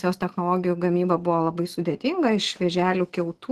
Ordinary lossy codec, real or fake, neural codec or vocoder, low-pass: Opus, 16 kbps; fake; autoencoder, 48 kHz, 128 numbers a frame, DAC-VAE, trained on Japanese speech; 14.4 kHz